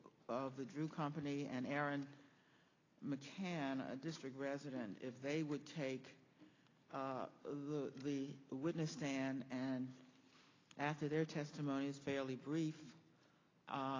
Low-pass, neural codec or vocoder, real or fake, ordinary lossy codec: 7.2 kHz; none; real; AAC, 32 kbps